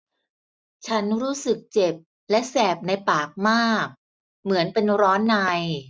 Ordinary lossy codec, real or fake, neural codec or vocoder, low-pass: none; real; none; none